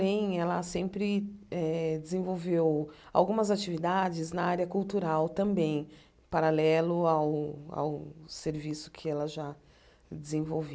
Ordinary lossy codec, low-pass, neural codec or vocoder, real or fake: none; none; none; real